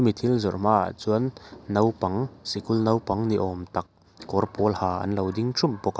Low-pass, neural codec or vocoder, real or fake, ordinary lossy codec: none; none; real; none